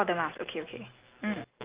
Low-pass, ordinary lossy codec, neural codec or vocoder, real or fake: 3.6 kHz; Opus, 32 kbps; none; real